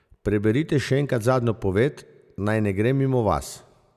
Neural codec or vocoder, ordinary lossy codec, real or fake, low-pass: none; none; real; 14.4 kHz